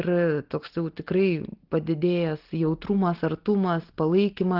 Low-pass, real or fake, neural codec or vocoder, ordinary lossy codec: 5.4 kHz; real; none; Opus, 16 kbps